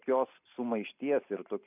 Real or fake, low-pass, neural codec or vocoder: real; 3.6 kHz; none